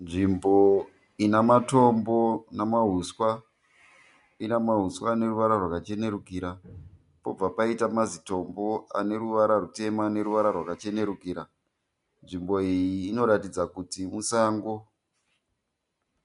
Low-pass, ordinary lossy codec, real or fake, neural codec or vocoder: 10.8 kHz; MP3, 64 kbps; real; none